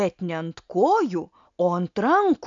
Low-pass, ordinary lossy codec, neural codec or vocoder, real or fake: 7.2 kHz; MP3, 64 kbps; none; real